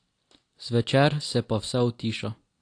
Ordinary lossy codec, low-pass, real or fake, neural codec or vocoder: AAC, 48 kbps; 9.9 kHz; real; none